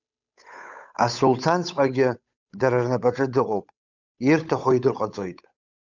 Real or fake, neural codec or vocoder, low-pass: fake; codec, 16 kHz, 8 kbps, FunCodec, trained on Chinese and English, 25 frames a second; 7.2 kHz